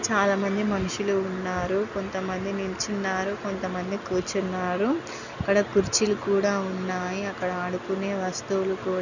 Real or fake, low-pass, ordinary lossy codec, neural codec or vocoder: real; 7.2 kHz; none; none